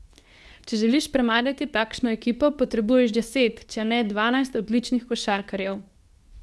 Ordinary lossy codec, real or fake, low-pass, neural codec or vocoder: none; fake; none; codec, 24 kHz, 0.9 kbps, WavTokenizer, medium speech release version 2